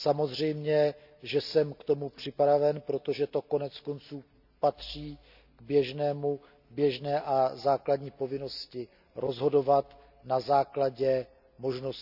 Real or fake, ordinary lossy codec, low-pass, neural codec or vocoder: real; none; 5.4 kHz; none